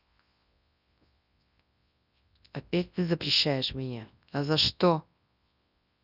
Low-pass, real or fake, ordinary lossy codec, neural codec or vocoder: 5.4 kHz; fake; none; codec, 24 kHz, 0.9 kbps, WavTokenizer, large speech release